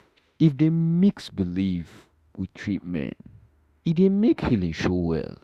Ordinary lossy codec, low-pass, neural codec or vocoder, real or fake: Opus, 64 kbps; 14.4 kHz; autoencoder, 48 kHz, 32 numbers a frame, DAC-VAE, trained on Japanese speech; fake